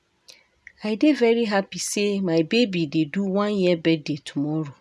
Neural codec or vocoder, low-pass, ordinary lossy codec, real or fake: none; none; none; real